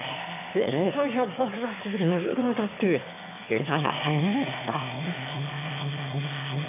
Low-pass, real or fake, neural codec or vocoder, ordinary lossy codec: 3.6 kHz; fake; autoencoder, 22.05 kHz, a latent of 192 numbers a frame, VITS, trained on one speaker; none